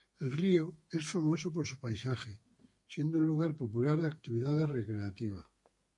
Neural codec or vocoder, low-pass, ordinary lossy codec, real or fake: codec, 32 kHz, 1.9 kbps, SNAC; 10.8 kHz; MP3, 48 kbps; fake